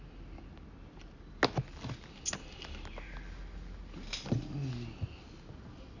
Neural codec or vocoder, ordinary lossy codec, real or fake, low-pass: none; none; real; 7.2 kHz